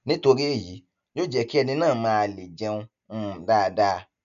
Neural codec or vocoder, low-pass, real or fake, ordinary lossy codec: none; 7.2 kHz; real; none